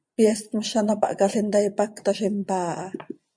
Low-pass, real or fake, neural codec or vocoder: 9.9 kHz; fake; vocoder, 24 kHz, 100 mel bands, Vocos